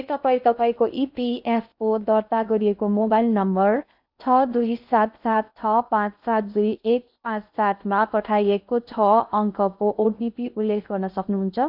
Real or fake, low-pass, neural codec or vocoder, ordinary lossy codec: fake; 5.4 kHz; codec, 16 kHz in and 24 kHz out, 0.6 kbps, FocalCodec, streaming, 2048 codes; none